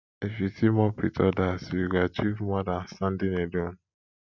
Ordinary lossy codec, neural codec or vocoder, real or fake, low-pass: none; none; real; 7.2 kHz